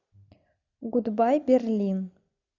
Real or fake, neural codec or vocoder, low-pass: real; none; 7.2 kHz